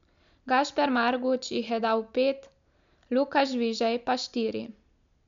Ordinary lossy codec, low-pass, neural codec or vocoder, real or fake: MP3, 64 kbps; 7.2 kHz; none; real